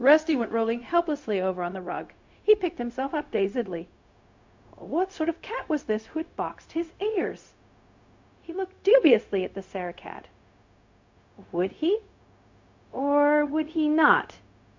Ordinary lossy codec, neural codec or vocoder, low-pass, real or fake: MP3, 48 kbps; codec, 16 kHz, 0.4 kbps, LongCat-Audio-Codec; 7.2 kHz; fake